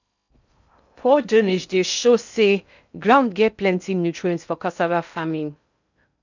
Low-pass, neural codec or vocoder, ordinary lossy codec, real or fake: 7.2 kHz; codec, 16 kHz in and 24 kHz out, 0.6 kbps, FocalCodec, streaming, 2048 codes; none; fake